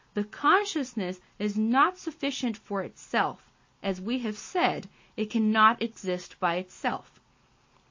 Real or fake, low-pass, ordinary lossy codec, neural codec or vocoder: real; 7.2 kHz; MP3, 32 kbps; none